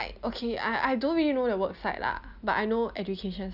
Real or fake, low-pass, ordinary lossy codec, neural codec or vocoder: real; 5.4 kHz; none; none